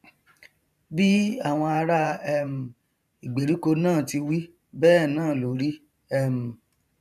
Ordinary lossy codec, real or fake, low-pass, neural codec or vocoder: none; fake; 14.4 kHz; vocoder, 44.1 kHz, 128 mel bands every 512 samples, BigVGAN v2